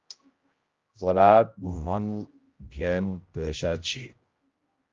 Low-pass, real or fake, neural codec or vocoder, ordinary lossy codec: 7.2 kHz; fake; codec, 16 kHz, 0.5 kbps, X-Codec, HuBERT features, trained on general audio; Opus, 32 kbps